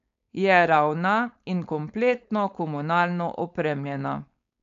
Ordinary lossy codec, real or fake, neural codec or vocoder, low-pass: MP3, 64 kbps; fake; codec, 16 kHz, 4.8 kbps, FACodec; 7.2 kHz